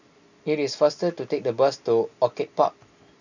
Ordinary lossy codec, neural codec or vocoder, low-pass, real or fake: none; none; 7.2 kHz; real